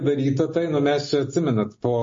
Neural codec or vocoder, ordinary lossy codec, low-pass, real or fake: none; MP3, 32 kbps; 7.2 kHz; real